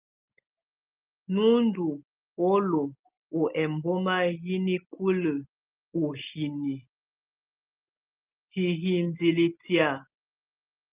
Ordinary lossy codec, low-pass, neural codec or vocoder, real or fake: Opus, 32 kbps; 3.6 kHz; none; real